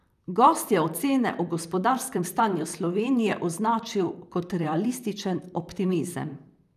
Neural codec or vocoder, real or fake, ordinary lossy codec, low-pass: vocoder, 44.1 kHz, 128 mel bands, Pupu-Vocoder; fake; none; 14.4 kHz